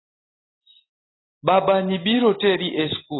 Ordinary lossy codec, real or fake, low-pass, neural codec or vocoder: AAC, 16 kbps; real; 7.2 kHz; none